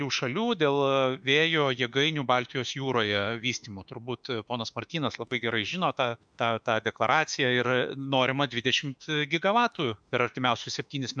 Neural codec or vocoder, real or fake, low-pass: autoencoder, 48 kHz, 32 numbers a frame, DAC-VAE, trained on Japanese speech; fake; 9.9 kHz